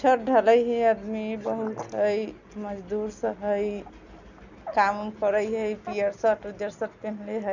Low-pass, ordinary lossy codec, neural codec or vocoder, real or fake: 7.2 kHz; none; none; real